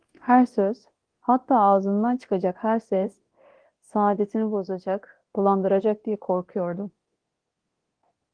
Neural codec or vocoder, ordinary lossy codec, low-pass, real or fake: codec, 24 kHz, 0.9 kbps, DualCodec; Opus, 16 kbps; 9.9 kHz; fake